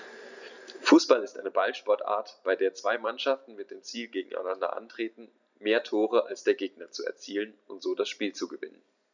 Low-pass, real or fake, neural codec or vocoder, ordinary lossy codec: 7.2 kHz; real; none; none